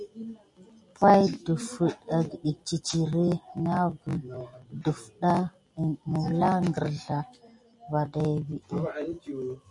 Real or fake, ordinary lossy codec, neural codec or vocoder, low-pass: real; MP3, 48 kbps; none; 10.8 kHz